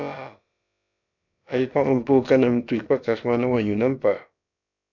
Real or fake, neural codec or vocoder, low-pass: fake; codec, 16 kHz, about 1 kbps, DyCAST, with the encoder's durations; 7.2 kHz